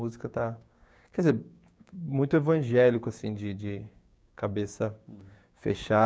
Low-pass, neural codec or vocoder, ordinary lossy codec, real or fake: none; codec, 16 kHz, 6 kbps, DAC; none; fake